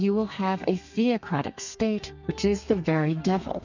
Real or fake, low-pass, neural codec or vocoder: fake; 7.2 kHz; codec, 32 kHz, 1.9 kbps, SNAC